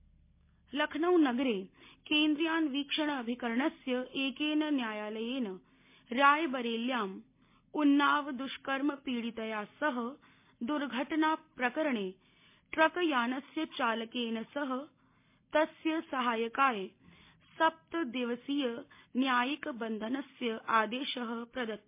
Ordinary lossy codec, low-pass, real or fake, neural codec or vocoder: MP3, 24 kbps; 3.6 kHz; real; none